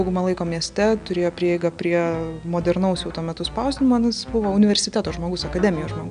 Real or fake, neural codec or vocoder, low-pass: real; none; 9.9 kHz